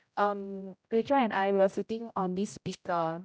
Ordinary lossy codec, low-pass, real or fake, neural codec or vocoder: none; none; fake; codec, 16 kHz, 0.5 kbps, X-Codec, HuBERT features, trained on general audio